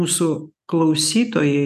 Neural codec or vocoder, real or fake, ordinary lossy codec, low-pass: none; real; AAC, 96 kbps; 14.4 kHz